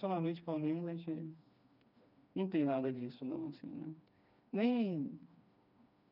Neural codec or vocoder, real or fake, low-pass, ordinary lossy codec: codec, 16 kHz, 2 kbps, FreqCodec, smaller model; fake; 5.4 kHz; none